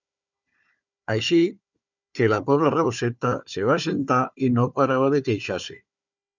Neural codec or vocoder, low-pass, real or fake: codec, 16 kHz, 4 kbps, FunCodec, trained on Chinese and English, 50 frames a second; 7.2 kHz; fake